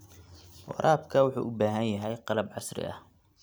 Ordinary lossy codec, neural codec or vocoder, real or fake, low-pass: none; none; real; none